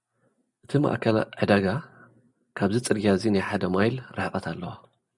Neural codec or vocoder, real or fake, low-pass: none; real; 10.8 kHz